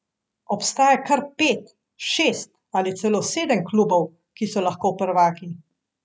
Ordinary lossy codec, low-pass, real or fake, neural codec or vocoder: none; none; real; none